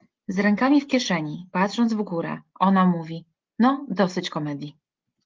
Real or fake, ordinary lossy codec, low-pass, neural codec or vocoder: real; Opus, 24 kbps; 7.2 kHz; none